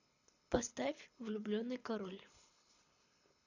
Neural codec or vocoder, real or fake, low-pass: codec, 24 kHz, 6 kbps, HILCodec; fake; 7.2 kHz